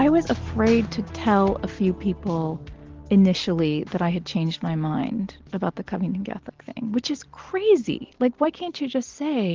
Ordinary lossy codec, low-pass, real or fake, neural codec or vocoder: Opus, 16 kbps; 7.2 kHz; real; none